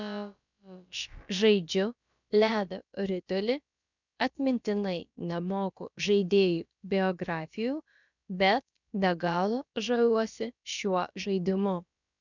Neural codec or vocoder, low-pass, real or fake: codec, 16 kHz, about 1 kbps, DyCAST, with the encoder's durations; 7.2 kHz; fake